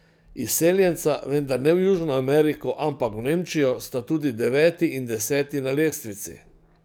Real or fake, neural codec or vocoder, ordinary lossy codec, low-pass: fake; codec, 44.1 kHz, 7.8 kbps, DAC; none; none